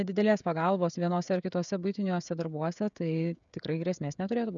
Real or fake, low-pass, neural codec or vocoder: fake; 7.2 kHz; codec, 16 kHz, 16 kbps, FreqCodec, smaller model